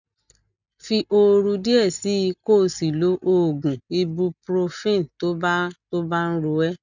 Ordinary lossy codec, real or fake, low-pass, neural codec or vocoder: none; real; 7.2 kHz; none